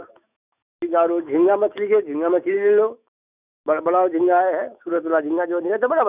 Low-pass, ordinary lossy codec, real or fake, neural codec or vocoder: 3.6 kHz; none; fake; autoencoder, 48 kHz, 128 numbers a frame, DAC-VAE, trained on Japanese speech